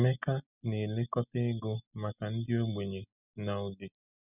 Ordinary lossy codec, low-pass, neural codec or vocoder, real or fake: none; 3.6 kHz; none; real